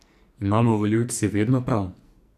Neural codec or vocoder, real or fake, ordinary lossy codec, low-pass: codec, 44.1 kHz, 2.6 kbps, SNAC; fake; none; 14.4 kHz